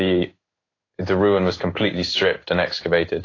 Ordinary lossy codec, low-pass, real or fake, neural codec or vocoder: AAC, 32 kbps; 7.2 kHz; fake; codec, 16 kHz in and 24 kHz out, 1 kbps, XY-Tokenizer